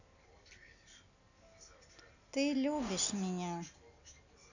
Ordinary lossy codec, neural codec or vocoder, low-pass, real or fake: none; none; 7.2 kHz; real